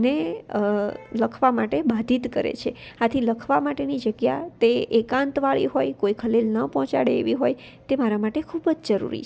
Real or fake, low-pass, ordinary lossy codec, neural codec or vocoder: real; none; none; none